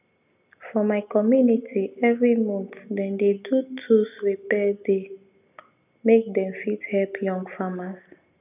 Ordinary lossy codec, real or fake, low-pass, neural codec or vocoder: none; real; 3.6 kHz; none